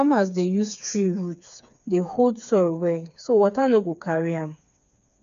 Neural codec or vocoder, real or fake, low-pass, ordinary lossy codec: codec, 16 kHz, 4 kbps, FreqCodec, smaller model; fake; 7.2 kHz; none